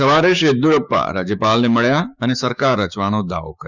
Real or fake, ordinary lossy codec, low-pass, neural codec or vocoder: fake; none; 7.2 kHz; codec, 16 kHz, 6 kbps, DAC